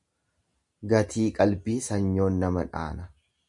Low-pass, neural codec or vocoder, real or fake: 10.8 kHz; none; real